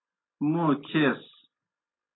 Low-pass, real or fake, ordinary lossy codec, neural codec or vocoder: 7.2 kHz; real; AAC, 16 kbps; none